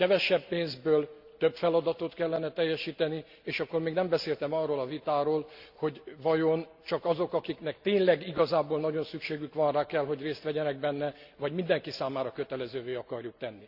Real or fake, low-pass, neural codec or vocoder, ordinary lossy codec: real; 5.4 kHz; none; Opus, 64 kbps